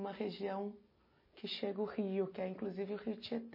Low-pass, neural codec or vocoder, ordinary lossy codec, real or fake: 5.4 kHz; none; MP3, 24 kbps; real